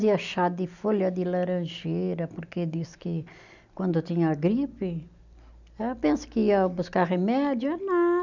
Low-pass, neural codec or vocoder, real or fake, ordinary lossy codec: 7.2 kHz; none; real; none